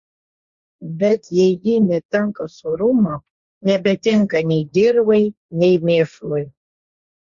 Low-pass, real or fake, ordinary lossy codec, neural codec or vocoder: 7.2 kHz; fake; Opus, 64 kbps; codec, 16 kHz, 1.1 kbps, Voila-Tokenizer